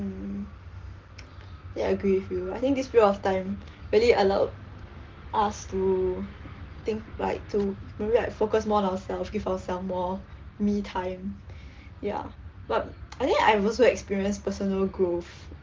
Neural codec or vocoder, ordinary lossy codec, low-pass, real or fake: none; Opus, 16 kbps; 7.2 kHz; real